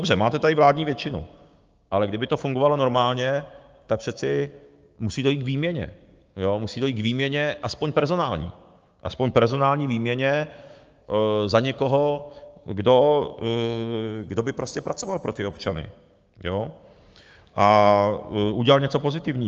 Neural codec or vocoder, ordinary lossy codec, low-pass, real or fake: codec, 16 kHz, 6 kbps, DAC; Opus, 24 kbps; 7.2 kHz; fake